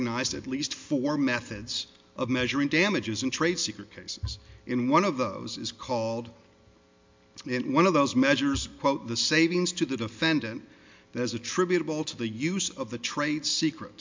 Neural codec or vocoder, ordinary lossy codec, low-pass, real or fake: none; MP3, 64 kbps; 7.2 kHz; real